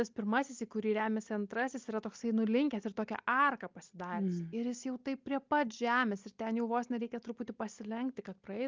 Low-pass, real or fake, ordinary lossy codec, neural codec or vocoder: 7.2 kHz; real; Opus, 32 kbps; none